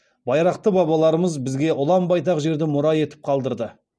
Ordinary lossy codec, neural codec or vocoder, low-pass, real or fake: none; none; none; real